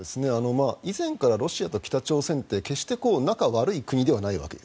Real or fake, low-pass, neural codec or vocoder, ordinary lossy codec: real; none; none; none